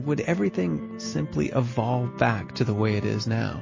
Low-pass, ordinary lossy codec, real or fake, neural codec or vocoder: 7.2 kHz; MP3, 32 kbps; real; none